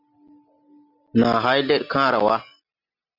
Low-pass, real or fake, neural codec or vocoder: 5.4 kHz; real; none